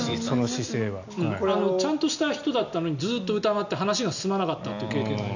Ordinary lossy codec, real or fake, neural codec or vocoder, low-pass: none; real; none; 7.2 kHz